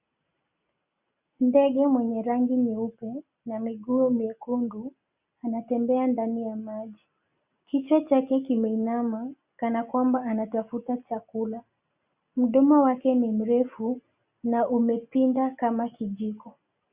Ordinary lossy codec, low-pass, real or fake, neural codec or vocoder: Opus, 64 kbps; 3.6 kHz; real; none